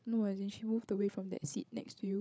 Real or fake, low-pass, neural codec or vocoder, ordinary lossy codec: fake; none; codec, 16 kHz, 16 kbps, FreqCodec, larger model; none